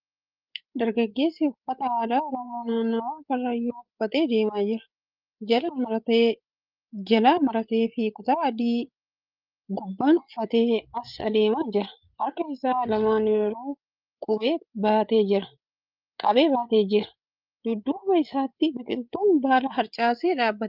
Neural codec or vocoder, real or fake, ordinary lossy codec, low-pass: codec, 16 kHz, 8 kbps, FreqCodec, larger model; fake; Opus, 24 kbps; 5.4 kHz